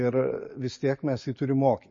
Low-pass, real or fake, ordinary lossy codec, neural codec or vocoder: 7.2 kHz; real; MP3, 32 kbps; none